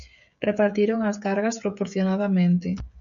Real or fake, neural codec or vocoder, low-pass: fake; codec, 16 kHz, 16 kbps, FreqCodec, smaller model; 7.2 kHz